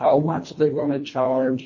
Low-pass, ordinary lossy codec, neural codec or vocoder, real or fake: 7.2 kHz; MP3, 32 kbps; codec, 24 kHz, 1.5 kbps, HILCodec; fake